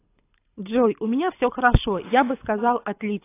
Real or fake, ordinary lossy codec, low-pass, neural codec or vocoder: fake; AAC, 24 kbps; 3.6 kHz; codec, 24 kHz, 6 kbps, HILCodec